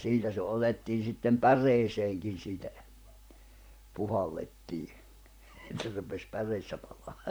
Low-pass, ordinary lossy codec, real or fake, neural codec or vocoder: none; none; fake; vocoder, 44.1 kHz, 128 mel bands, Pupu-Vocoder